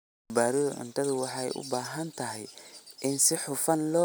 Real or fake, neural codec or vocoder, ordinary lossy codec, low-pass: real; none; none; none